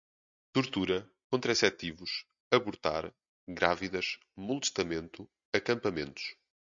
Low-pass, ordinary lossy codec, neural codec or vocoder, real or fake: 7.2 kHz; MP3, 64 kbps; none; real